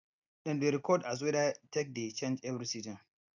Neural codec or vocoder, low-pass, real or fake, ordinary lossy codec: none; 7.2 kHz; real; none